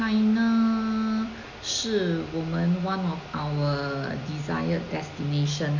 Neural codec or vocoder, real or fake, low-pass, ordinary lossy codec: none; real; 7.2 kHz; none